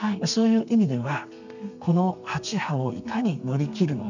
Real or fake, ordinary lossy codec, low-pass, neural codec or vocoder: fake; none; 7.2 kHz; codec, 32 kHz, 1.9 kbps, SNAC